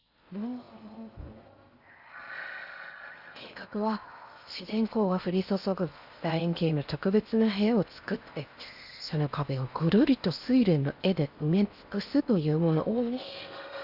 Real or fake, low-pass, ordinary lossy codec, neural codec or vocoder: fake; 5.4 kHz; Opus, 64 kbps; codec, 16 kHz in and 24 kHz out, 0.6 kbps, FocalCodec, streaming, 4096 codes